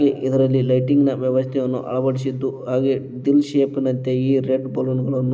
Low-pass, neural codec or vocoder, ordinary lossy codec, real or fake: none; none; none; real